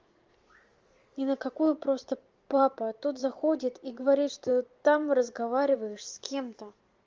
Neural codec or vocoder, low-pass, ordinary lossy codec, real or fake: vocoder, 44.1 kHz, 128 mel bands every 512 samples, BigVGAN v2; 7.2 kHz; Opus, 32 kbps; fake